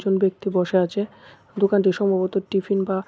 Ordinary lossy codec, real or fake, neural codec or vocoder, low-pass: none; real; none; none